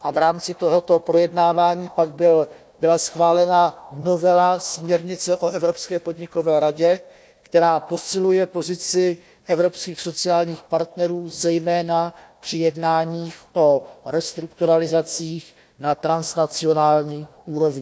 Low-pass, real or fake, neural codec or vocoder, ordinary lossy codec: none; fake; codec, 16 kHz, 1 kbps, FunCodec, trained on Chinese and English, 50 frames a second; none